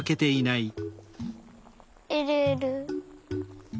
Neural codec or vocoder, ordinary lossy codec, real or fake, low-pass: none; none; real; none